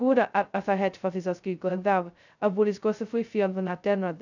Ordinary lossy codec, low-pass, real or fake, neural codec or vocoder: none; 7.2 kHz; fake; codec, 16 kHz, 0.2 kbps, FocalCodec